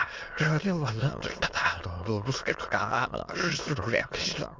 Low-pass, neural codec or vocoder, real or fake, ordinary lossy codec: 7.2 kHz; autoencoder, 22.05 kHz, a latent of 192 numbers a frame, VITS, trained on many speakers; fake; Opus, 32 kbps